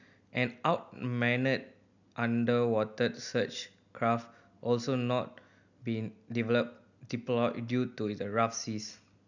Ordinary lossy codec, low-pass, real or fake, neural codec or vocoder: none; 7.2 kHz; real; none